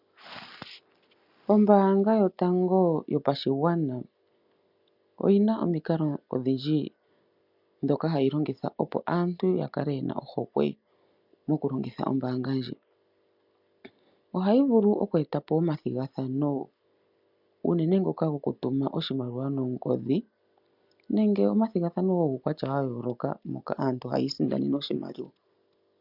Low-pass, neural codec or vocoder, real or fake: 5.4 kHz; none; real